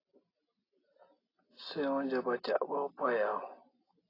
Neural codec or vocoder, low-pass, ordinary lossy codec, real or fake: none; 5.4 kHz; AAC, 24 kbps; real